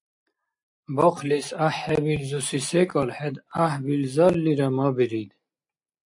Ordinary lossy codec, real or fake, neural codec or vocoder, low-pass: AAC, 64 kbps; real; none; 10.8 kHz